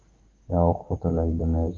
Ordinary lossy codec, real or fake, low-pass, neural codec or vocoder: Opus, 16 kbps; fake; 7.2 kHz; codec, 16 kHz, 6 kbps, DAC